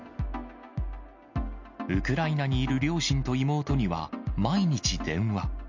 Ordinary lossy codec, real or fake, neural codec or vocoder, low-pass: MP3, 48 kbps; real; none; 7.2 kHz